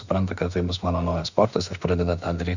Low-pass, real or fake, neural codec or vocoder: 7.2 kHz; fake; autoencoder, 48 kHz, 32 numbers a frame, DAC-VAE, trained on Japanese speech